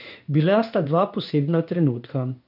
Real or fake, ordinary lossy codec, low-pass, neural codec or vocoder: fake; none; 5.4 kHz; codec, 16 kHz in and 24 kHz out, 1 kbps, XY-Tokenizer